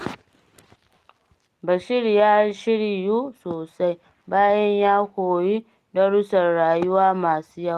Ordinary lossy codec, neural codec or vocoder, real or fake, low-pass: Opus, 24 kbps; none; real; 14.4 kHz